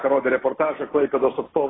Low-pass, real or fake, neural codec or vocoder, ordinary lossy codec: 7.2 kHz; real; none; AAC, 16 kbps